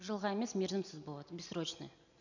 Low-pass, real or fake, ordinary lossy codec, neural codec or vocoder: 7.2 kHz; real; none; none